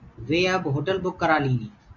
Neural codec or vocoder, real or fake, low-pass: none; real; 7.2 kHz